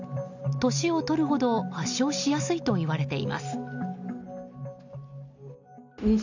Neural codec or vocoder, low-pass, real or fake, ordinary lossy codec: none; 7.2 kHz; real; none